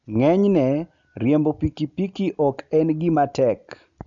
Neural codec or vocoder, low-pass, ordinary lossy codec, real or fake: none; 7.2 kHz; none; real